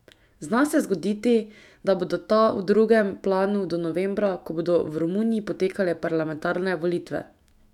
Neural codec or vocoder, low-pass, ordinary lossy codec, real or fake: autoencoder, 48 kHz, 128 numbers a frame, DAC-VAE, trained on Japanese speech; 19.8 kHz; none; fake